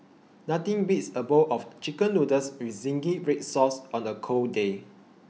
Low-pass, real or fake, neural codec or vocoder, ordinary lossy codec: none; real; none; none